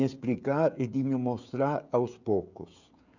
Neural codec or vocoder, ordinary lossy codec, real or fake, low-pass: codec, 16 kHz, 16 kbps, FreqCodec, smaller model; none; fake; 7.2 kHz